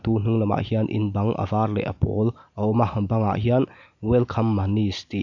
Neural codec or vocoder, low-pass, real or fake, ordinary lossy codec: none; 7.2 kHz; real; none